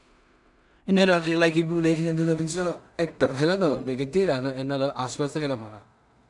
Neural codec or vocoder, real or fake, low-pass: codec, 16 kHz in and 24 kHz out, 0.4 kbps, LongCat-Audio-Codec, two codebook decoder; fake; 10.8 kHz